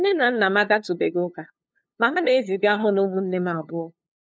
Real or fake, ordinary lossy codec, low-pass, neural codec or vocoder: fake; none; none; codec, 16 kHz, 2 kbps, FunCodec, trained on LibriTTS, 25 frames a second